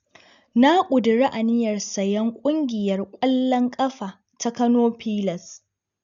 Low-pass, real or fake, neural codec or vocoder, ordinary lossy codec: 7.2 kHz; real; none; none